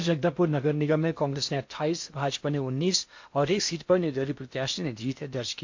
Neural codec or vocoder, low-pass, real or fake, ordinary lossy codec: codec, 16 kHz in and 24 kHz out, 0.8 kbps, FocalCodec, streaming, 65536 codes; 7.2 kHz; fake; MP3, 48 kbps